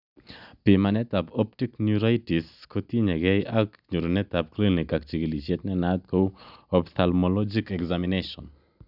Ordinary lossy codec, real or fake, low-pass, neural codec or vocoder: none; real; 5.4 kHz; none